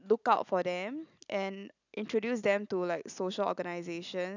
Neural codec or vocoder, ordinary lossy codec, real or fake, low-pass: none; none; real; 7.2 kHz